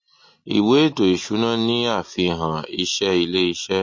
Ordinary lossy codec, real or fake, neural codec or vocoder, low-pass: MP3, 32 kbps; real; none; 7.2 kHz